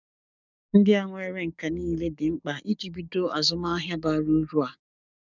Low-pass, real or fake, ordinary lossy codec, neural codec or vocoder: 7.2 kHz; fake; none; codec, 44.1 kHz, 7.8 kbps, DAC